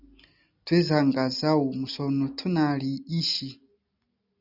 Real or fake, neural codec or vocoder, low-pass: real; none; 5.4 kHz